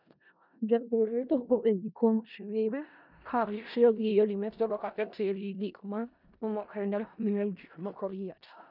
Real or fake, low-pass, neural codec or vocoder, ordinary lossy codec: fake; 5.4 kHz; codec, 16 kHz in and 24 kHz out, 0.4 kbps, LongCat-Audio-Codec, four codebook decoder; none